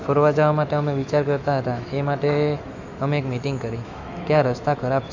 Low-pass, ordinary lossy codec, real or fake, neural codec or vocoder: 7.2 kHz; AAC, 48 kbps; fake; autoencoder, 48 kHz, 128 numbers a frame, DAC-VAE, trained on Japanese speech